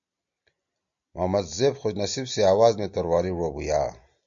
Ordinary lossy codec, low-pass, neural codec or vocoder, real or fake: MP3, 64 kbps; 7.2 kHz; none; real